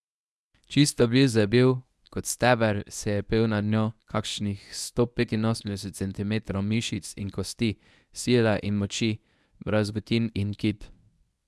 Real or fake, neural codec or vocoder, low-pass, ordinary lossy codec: fake; codec, 24 kHz, 0.9 kbps, WavTokenizer, medium speech release version 1; none; none